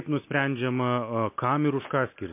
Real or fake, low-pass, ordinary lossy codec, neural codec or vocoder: real; 3.6 kHz; MP3, 24 kbps; none